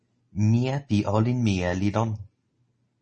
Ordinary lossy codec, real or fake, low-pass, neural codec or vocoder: MP3, 32 kbps; real; 10.8 kHz; none